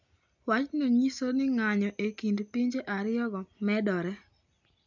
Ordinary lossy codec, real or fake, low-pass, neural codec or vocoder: none; real; 7.2 kHz; none